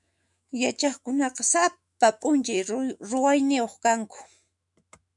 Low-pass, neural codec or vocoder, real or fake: 10.8 kHz; autoencoder, 48 kHz, 128 numbers a frame, DAC-VAE, trained on Japanese speech; fake